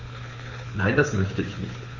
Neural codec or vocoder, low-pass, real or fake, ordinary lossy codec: codec, 24 kHz, 6 kbps, HILCodec; 7.2 kHz; fake; MP3, 32 kbps